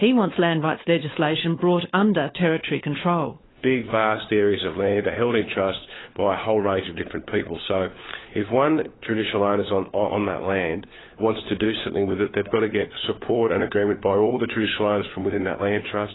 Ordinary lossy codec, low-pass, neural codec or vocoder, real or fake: AAC, 16 kbps; 7.2 kHz; codec, 16 kHz, 4 kbps, FunCodec, trained on LibriTTS, 50 frames a second; fake